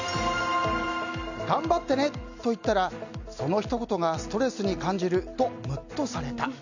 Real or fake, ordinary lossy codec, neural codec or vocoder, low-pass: real; none; none; 7.2 kHz